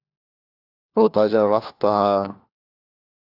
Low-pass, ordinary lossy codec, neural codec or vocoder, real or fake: 5.4 kHz; AAC, 48 kbps; codec, 16 kHz, 1 kbps, FunCodec, trained on LibriTTS, 50 frames a second; fake